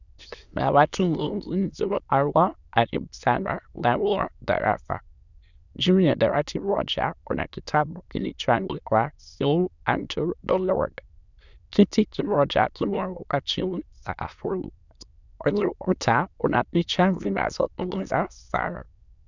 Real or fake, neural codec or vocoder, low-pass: fake; autoencoder, 22.05 kHz, a latent of 192 numbers a frame, VITS, trained on many speakers; 7.2 kHz